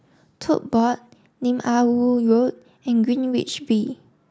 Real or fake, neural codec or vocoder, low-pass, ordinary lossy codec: real; none; none; none